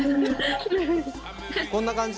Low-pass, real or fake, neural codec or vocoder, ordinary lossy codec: none; real; none; none